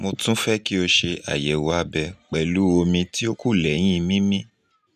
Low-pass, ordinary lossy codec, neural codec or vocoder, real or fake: 14.4 kHz; none; none; real